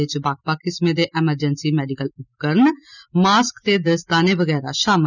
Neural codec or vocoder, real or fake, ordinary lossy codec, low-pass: none; real; none; 7.2 kHz